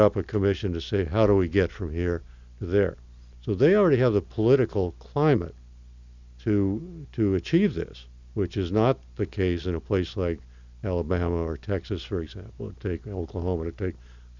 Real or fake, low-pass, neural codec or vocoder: real; 7.2 kHz; none